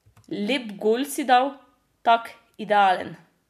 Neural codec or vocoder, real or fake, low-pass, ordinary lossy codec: none; real; 14.4 kHz; none